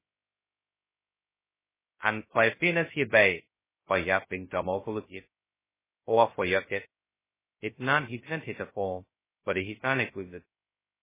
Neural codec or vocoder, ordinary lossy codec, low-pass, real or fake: codec, 16 kHz, 0.2 kbps, FocalCodec; MP3, 16 kbps; 3.6 kHz; fake